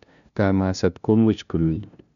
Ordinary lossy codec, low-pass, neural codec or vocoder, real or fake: none; 7.2 kHz; codec, 16 kHz, 0.5 kbps, FunCodec, trained on LibriTTS, 25 frames a second; fake